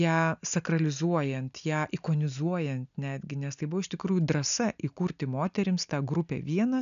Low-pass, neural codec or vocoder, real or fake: 7.2 kHz; none; real